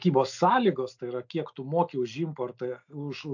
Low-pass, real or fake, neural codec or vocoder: 7.2 kHz; real; none